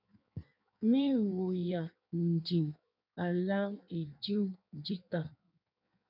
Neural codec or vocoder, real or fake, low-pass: codec, 16 kHz in and 24 kHz out, 1.1 kbps, FireRedTTS-2 codec; fake; 5.4 kHz